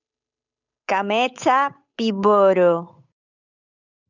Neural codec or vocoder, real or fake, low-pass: codec, 16 kHz, 8 kbps, FunCodec, trained on Chinese and English, 25 frames a second; fake; 7.2 kHz